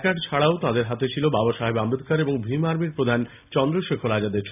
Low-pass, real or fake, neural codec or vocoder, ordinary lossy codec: 3.6 kHz; real; none; none